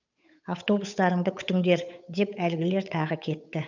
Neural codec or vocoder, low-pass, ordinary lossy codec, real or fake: codec, 16 kHz, 8 kbps, FunCodec, trained on Chinese and English, 25 frames a second; 7.2 kHz; none; fake